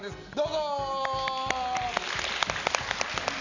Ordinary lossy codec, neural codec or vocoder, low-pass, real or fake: none; none; 7.2 kHz; real